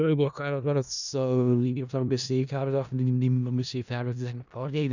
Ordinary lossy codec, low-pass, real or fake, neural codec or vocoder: none; 7.2 kHz; fake; codec, 16 kHz in and 24 kHz out, 0.4 kbps, LongCat-Audio-Codec, four codebook decoder